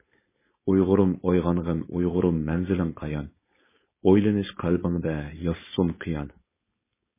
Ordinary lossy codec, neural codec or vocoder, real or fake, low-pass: MP3, 16 kbps; codec, 16 kHz, 4.8 kbps, FACodec; fake; 3.6 kHz